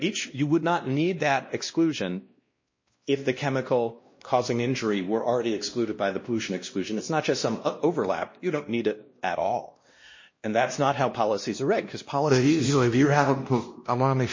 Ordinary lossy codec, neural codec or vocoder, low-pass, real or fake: MP3, 32 kbps; codec, 16 kHz, 1 kbps, X-Codec, WavLM features, trained on Multilingual LibriSpeech; 7.2 kHz; fake